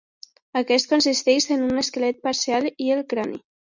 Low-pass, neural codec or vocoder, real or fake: 7.2 kHz; none; real